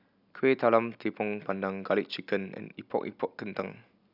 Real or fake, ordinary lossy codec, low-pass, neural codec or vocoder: real; none; 5.4 kHz; none